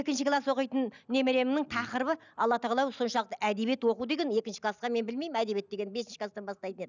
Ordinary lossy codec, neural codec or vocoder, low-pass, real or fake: none; none; 7.2 kHz; real